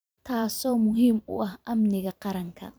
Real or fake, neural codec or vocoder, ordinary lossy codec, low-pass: fake; vocoder, 44.1 kHz, 128 mel bands every 512 samples, BigVGAN v2; none; none